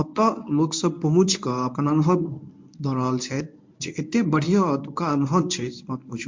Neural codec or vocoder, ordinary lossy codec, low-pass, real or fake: codec, 24 kHz, 0.9 kbps, WavTokenizer, medium speech release version 1; MP3, 48 kbps; 7.2 kHz; fake